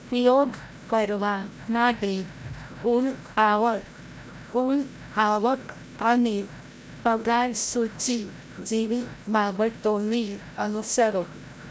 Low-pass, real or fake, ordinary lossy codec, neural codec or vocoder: none; fake; none; codec, 16 kHz, 0.5 kbps, FreqCodec, larger model